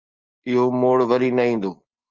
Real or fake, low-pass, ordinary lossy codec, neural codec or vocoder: real; 7.2 kHz; Opus, 32 kbps; none